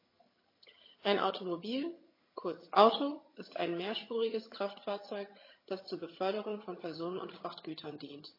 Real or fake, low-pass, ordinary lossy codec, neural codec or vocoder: fake; 5.4 kHz; MP3, 24 kbps; vocoder, 22.05 kHz, 80 mel bands, HiFi-GAN